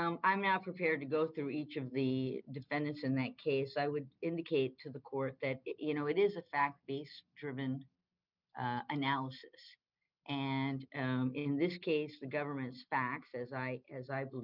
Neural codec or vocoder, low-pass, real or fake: none; 5.4 kHz; real